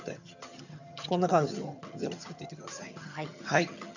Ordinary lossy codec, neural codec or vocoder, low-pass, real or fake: none; vocoder, 22.05 kHz, 80 mel bands, HiFi-GAN; 7.2 kHz; fake